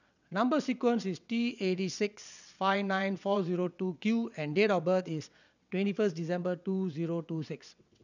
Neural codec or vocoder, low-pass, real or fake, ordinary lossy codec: vocoder, 22.05 kHz, 80 mel bands, WaveNeXt; 7.2 kHz; fake; none